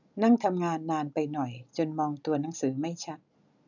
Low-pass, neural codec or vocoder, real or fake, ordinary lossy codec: 7.2 kHz; none; real; none